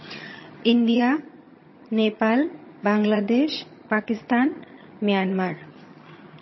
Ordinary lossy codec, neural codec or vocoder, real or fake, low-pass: MP3, 24 kbps; vocoder, 22.05 kHz, 80 mel bands, HiFi-GAN; fake; 7.2 kHz